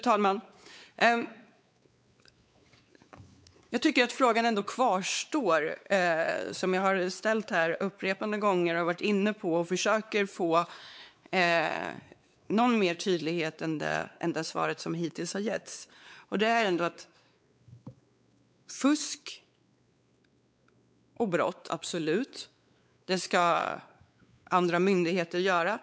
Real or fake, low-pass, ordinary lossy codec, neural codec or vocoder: fake; none; none; codec, 16 kHz, 4 kbps, X-Codec, WavLM features, trained on Multilingual LibriSpeech